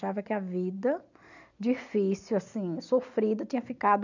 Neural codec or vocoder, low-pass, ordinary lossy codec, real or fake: none; 7.2 kHz; none; real